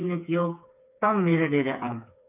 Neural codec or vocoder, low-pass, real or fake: codec, 16 kHz, 4 kbps, FreqCodec, smaller model; 3.6 kHz; fake